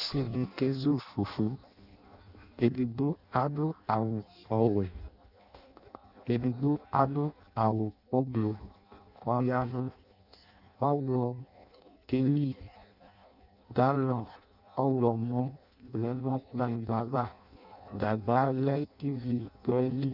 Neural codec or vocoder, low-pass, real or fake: codec, 16 kHz in and 24 kHz out, 0.6 kbps, FireRedTTS-2 codec; 5.4 kHz; fake